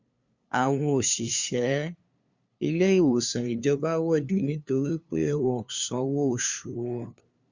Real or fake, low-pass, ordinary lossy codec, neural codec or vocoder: fake; 7.2 kHz; Opus, 64 kbps; codec, 16 kHz, 2 kbps, FunCodec, trained on LibriTTS, 25 frames a second